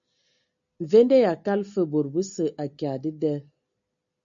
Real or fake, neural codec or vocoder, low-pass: real; none; 7.2 kHz